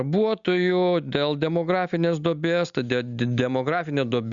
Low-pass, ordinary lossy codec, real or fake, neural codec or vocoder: 7.2 kHz; Opus, 64 kbps; real; none